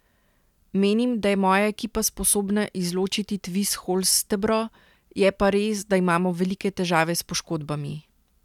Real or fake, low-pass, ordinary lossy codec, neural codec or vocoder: real; 19.8 kHz; none; none